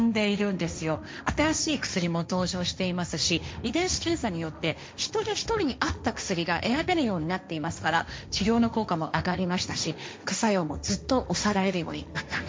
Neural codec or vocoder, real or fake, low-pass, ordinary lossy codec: codec, 16 kHz, 1.1 kbps, Voila-Tokenizer; fake; none; none